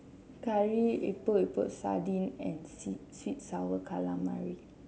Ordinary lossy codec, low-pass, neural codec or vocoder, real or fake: none; none; none; real